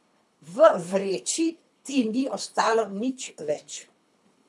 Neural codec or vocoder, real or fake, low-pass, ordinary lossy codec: codec, 24 kHz, 3 kbps, HILCodec; fake; none; none